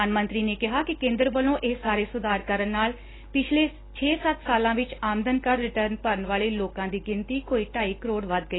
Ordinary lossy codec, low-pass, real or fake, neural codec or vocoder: AAC, 16 kbps; 7.2 kHz; real; none